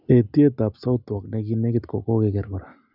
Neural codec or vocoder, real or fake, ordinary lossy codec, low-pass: none; real; none; 5.4 kHz